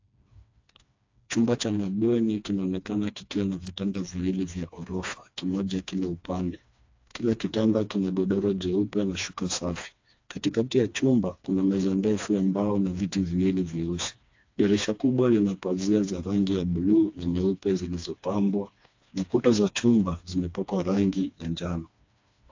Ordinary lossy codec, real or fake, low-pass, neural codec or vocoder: AAC, 48 kbps; fake; 7.2 kHz; codec, 16 kHz, 2 kbps, FreqCodec, smaller model